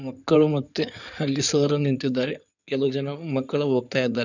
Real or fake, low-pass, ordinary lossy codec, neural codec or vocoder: fake; 7.2 kHz; none; codec, 16 kHz in and 24 kHz out, 2.2 kbps, FireRedTTS-2 codec